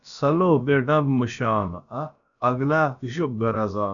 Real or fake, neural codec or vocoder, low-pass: fake; codec, 16 kHz, about 1 kbps, DyCAST, with the encoder's durations; 7.2 kHz